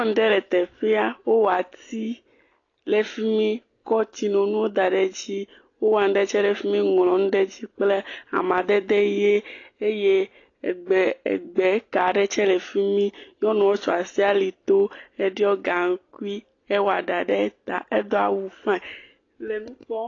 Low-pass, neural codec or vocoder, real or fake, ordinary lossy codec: 7.2 kHz; none; real; AAC, 32 kbps